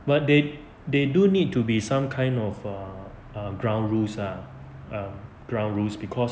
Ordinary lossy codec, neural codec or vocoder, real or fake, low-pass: none; none; real; none